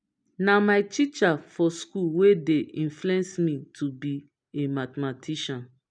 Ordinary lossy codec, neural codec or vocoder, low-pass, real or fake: none; none; 9.9 kHz; real